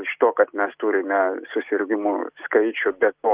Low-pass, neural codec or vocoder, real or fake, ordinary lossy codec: 3.6 kHz; none; real; Opus, 24 kbps